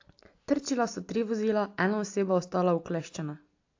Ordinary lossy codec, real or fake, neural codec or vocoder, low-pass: AAC, 48 kbps; real; none; 7.2 kHz